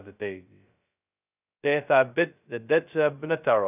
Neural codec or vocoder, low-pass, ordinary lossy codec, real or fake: codec, 16 kHz, 0.2 kbps, FocalCodec; 3.6 kHz; none; fake